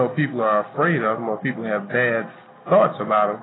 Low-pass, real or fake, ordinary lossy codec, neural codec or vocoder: 7.2 kHz; real; AAC, 16 kbps; none